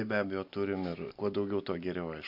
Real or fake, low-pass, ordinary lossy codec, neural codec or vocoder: real; 5.4 kHz; AAC, 48 kbps; none